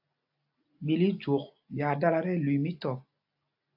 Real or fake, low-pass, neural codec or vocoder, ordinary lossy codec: real; 5.4 kHz; none; AAC, 48 kbps